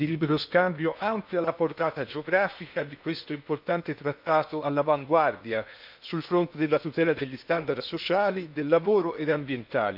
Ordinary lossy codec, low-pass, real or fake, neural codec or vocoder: none; 5.4 kHz; fake; codec, 16 kHz in and 24 kHz out, 0.8 kbps, FocalCodec, streaming, 65536 codes